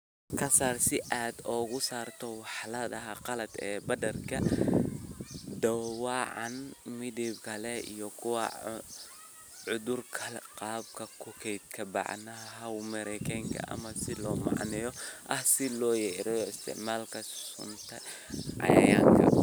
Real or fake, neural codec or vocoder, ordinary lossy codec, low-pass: real; none; none; none